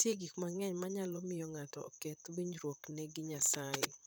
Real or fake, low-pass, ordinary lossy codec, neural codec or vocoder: fake; none; none; vocoder, 44.1 kHz, 128 mel bands, Pupu-Vocoder